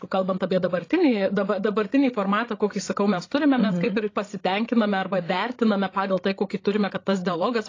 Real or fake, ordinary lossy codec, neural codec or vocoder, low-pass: fake; AAC, 32 kbps; codec, 16 kHz, 16 kbps, FreqCodec, larger model; 7.2 kHz